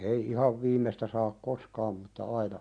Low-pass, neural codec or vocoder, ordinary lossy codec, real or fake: 9.9 kHz; none; none; real